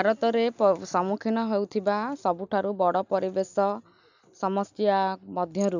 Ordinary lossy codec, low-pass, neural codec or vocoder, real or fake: none; 7.2 kHz; none; real